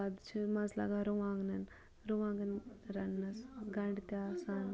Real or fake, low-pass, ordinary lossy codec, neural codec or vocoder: real; none; none; none